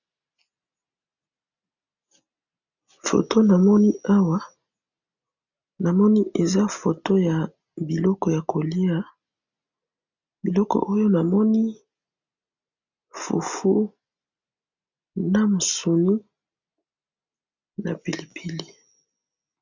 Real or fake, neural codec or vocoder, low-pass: real; none; 7.2 kHz